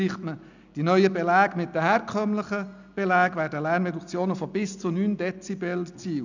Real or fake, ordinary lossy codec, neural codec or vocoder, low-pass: real; none; none; 7.2 kHz